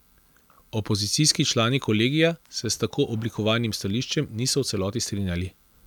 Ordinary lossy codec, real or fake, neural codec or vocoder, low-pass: none; real; none; 19.8 kHz